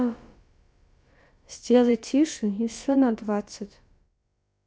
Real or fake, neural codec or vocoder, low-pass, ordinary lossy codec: fake; codec, 16 kHz, about 1 kbps, DyCAST, with the encoder's durations; none; none